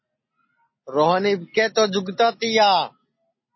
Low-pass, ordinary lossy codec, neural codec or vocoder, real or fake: 7.2 kHz; MP3, 24 kbps; none; real